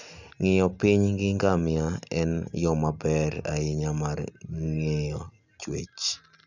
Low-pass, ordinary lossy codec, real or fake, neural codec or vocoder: 7.2 kHz; none; real; none